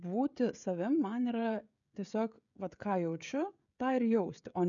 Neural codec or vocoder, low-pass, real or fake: codec, 16 kHz, 16 kbps, FreqCodec, smaller model; 7.2 kHz; fake